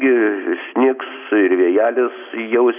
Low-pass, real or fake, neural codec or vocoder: 3.6 kHz; real; none